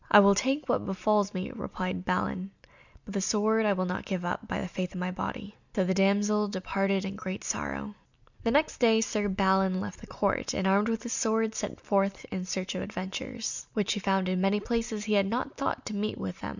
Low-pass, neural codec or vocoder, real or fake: 7.2 kHz; none; real